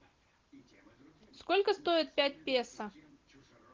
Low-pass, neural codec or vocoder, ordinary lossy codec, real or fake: 7.2 kHz; none; Opus, 16 kbps; real